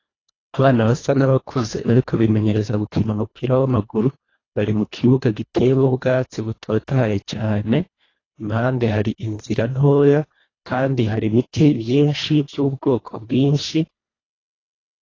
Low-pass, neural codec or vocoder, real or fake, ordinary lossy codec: 7.2 kHz; codec, 24 kHz, 1.5 kbps, HILCodec; fake; AAC, 32 kbps